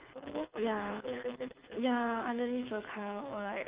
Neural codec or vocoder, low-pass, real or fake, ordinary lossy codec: codec, 24 kHz, 6 kbps, HILCodec; 3.6 kHz; fake; Opus, 24 kbps